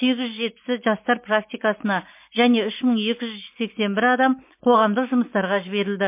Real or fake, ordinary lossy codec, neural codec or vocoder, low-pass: real; MP3, 24 kbps; none; 3.6 kHz